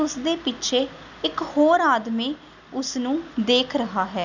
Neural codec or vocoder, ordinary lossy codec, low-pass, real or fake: none; none; 7.2 kHz; real